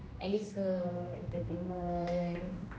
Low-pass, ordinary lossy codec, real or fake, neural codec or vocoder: none; none; fake; codec, 16 kHz, 1 kbps, X-Codec, HuBERT features, trained on general audio